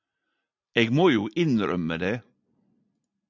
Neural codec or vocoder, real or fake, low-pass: none; real; 7.2 kHz